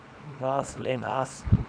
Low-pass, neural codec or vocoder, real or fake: 9.9 kHz; codec, 24 kHz, 0.9 kbps, WavTokenizer, small release; fake